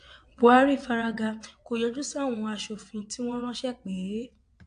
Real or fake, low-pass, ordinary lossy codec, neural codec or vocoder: fake; 9.9 kHz; AAC, 64 kbps; vocoder, 22.05 kHz, 80 mel bands, WaveNeXt